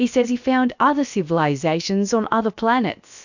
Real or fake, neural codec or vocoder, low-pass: fake; codec, 16 kHz, about 1 kbps, DyCAST, with the encoder's durations; 7.2 kHz